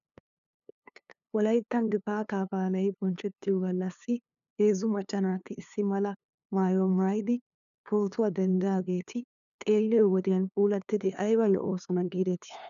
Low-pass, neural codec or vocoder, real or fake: 7.2 kHz; codec, 16 kHz, 2 kbps, FunCodec, trained on LibriTTS, 25 frames a second; fake